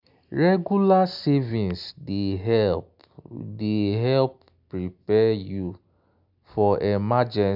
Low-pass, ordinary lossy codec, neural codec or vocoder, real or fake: 5.4 kHz; none; none; real